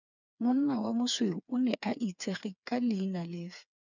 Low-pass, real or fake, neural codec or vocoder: 7.2 kHz; fake; codec, 16 kHz, 4 kbps, FreqCodec, smaller model